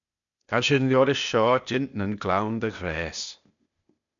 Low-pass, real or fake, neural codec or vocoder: 7.2 kHz; fake; codec, 16 kHz, 0.8 kbps, ZipCodec